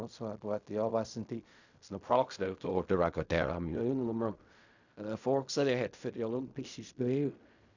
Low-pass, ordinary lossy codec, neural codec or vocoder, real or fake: 7.2 kHz; none; codec, 16 kHz in and 24 kHz out, 0.4 kbps, LongCat-Audio-Codec, fine tuned four codebook decoder; fake